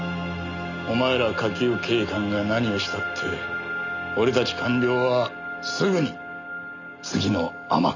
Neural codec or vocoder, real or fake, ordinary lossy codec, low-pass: none; real; none; 7.2 kHz